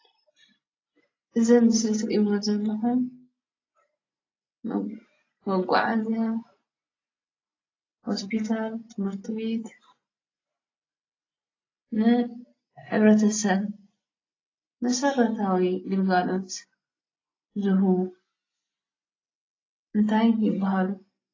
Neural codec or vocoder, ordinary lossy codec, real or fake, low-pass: none; AAC, 32 kbps; real; 7.2 kHz